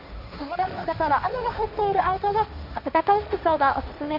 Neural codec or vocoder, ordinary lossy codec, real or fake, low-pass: codec, 16 kHz, 1.1 kbps, Voila-Tokenizer; none; fake; 5.4 kHz